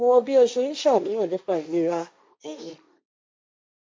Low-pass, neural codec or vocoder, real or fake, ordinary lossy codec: none; codec, 16 kHz, 1.1 kbps, Voila-Tokenizer; fake; none